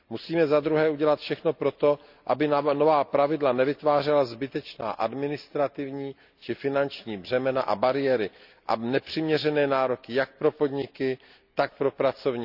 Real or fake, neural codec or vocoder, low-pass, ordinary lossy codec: real; none; 5.4 kHz; none